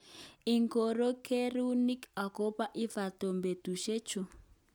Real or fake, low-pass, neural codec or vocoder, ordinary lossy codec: real; none; none; none